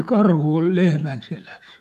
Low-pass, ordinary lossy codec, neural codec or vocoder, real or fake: 14.4 kHz; none; autoencoder, 48 kHz, 128 numbers a frame, DAC-VAE, trained on Japanese speech; fake